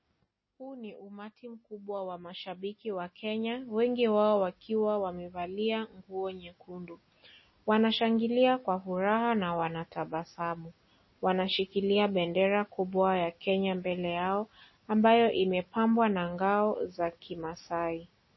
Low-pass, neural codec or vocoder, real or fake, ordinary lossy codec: 7.2 kHz; none; real; MP3, 24 kbps